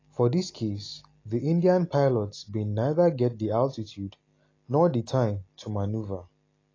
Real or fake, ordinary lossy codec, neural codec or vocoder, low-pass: real; AAC, 32 kbps; none; 7.2 kHz